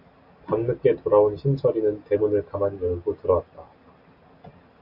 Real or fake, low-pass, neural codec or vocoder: real; 5.4 kHz; none